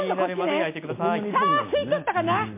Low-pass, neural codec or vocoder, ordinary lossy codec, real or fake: 3.6 kHz; none; MP3, 24 kbps; real